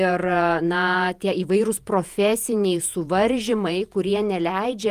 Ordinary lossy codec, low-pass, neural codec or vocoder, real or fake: Opus, 32 kbps; 14.4 kHz; vocoder, 48 kHz, 128 mel bands, Vocos; fake